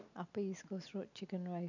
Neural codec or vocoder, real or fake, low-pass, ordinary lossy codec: none; real; 7.2 kHz; none